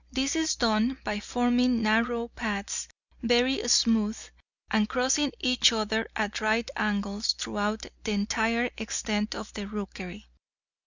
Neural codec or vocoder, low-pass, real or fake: none; 7.2 kHz; real